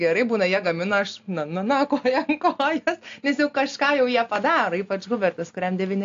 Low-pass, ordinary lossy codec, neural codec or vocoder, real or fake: 7.2 kHz; AAC, 48 kbps; none; real